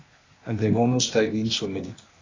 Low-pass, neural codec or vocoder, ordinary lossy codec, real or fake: 7.2 kHz; codec, 16 kHz, 0.8 kbps, ZipCodec; AAC, 32 kbps; fake